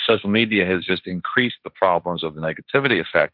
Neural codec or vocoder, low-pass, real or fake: codec, 16 kHz, 1.1 kbps, Voila-Tokenizer; 5.4 kHz; fake